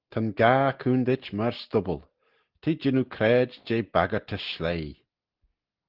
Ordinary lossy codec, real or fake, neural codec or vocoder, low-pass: Opus, 16 kbps; real; none; 5.4 kHz